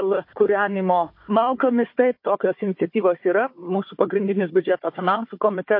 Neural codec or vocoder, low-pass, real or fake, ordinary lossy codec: codec, 16 kHz, 4 kbps, FunCodec, trained on Chinese and English, 50 frames a second; 5.4 kHz; fake; MP3, 32 kbps